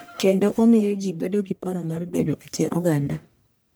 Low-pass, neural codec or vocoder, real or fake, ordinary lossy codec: none; codec, 44.1 kHz, 1.7 kbps, Pupu-Codec; fake; none